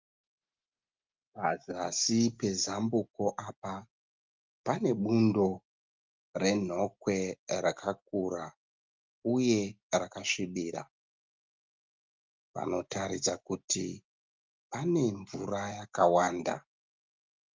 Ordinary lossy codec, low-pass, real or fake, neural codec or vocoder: Opus, 32 kbps; 7.2 kHz; real; none